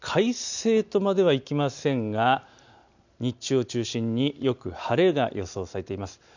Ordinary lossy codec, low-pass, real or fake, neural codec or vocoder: none; 7.2 kHz; real; none